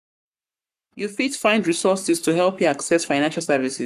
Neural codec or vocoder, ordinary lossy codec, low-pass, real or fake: codec, 44.1 kHz, 7.8 kbps, Pupu-Codec; none; 14.4 kHz; fake